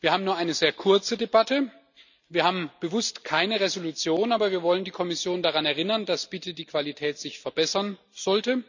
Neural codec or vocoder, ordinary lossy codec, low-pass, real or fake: none; none; 7.2 kHz; real